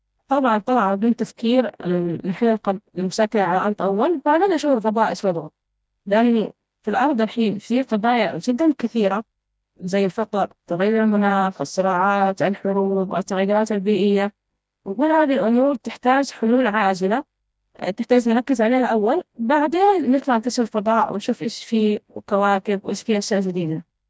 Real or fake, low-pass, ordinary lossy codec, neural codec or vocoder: fake; none; none; codec, 16 kHz, 1 kbps, FreqCodec, smaller model